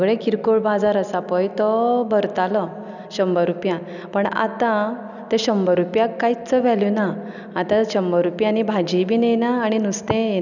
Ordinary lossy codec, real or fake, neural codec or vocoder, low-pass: none; real; none; 7.2 kHz